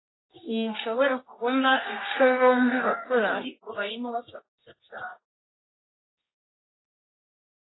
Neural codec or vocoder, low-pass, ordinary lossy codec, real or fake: codec, 24 kHz, 0.9 kbps, WavTokenizer, medium music audio release; 7.2 kHz; AAC, 16 kbps; fake